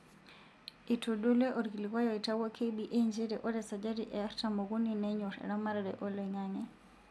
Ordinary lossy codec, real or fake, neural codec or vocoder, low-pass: none; real; none; none